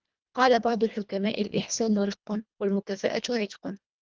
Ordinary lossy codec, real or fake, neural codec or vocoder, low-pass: Opus, 24 kbps; fake; codec, 24 kHz, 1.5 kbps, HILCodec; 7.2 kHz